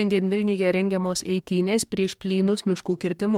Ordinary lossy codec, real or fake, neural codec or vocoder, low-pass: MP3, 96 kbps; fake; codec, 44.1 kHz, 2.6 kbps, DAC; 19.8 kHz